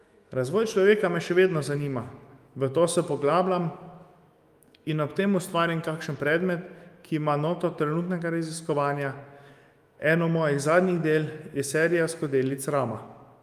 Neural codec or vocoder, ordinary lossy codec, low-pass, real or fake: autoencoder, 48 kHz, 128 numbers a frame, DAC-VAE, trained on Japanese speech; Opus, 32 kbps; 14.4 kHz; fake